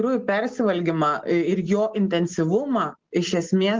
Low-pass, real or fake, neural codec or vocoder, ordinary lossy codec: 7.2 kHz; real; none; Opus, 16 kbps